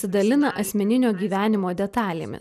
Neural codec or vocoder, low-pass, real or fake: none; 14.4 kHz; real